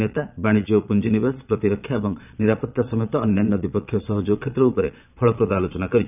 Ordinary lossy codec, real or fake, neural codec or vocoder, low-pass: none; fake; vocoder, 22.05 kHz, 80 mel bands, Vocos; 3.6 kHz